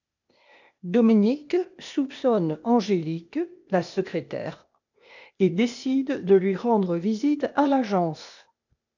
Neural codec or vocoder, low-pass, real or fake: codec, 16 kHz, 0.8 kbps, ZipCodec; 7.2 kHz; fake